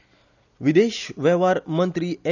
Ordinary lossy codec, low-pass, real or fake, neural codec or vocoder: none; 7.2 kHz; real; none